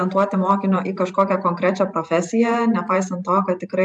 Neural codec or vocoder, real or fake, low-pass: vocoder, 44.1 kHz, 128 mel bands every 512 samples, BigVGAN v2; fake; 10.8 kHz